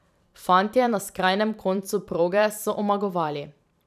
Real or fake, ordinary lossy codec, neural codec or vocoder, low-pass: fake; none; vocoder, 44.1 kHz, 128 mel bands every 512 samples, BigVGAN v2; 14.4 kHz